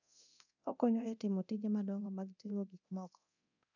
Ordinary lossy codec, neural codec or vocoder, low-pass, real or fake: none; codec, 24 kHz, 0.9 kbps, DualCodec; 7.2 kHz; fake